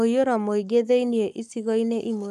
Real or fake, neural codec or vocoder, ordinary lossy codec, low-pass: fake; codec, 44.1 kHz, 7.8 kbps, Pupu-Codec; none; 14.4 kHz